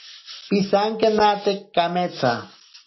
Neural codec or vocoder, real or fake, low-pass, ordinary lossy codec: none; real; 7.2 kHz; MP3, 24 kbps